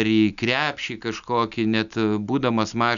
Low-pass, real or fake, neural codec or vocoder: 7.2 kHz; real; none